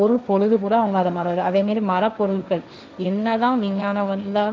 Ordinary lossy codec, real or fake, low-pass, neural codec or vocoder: none; fake; none; codec, 16 kHz, 1.1 kbps, Voila-Tokenizer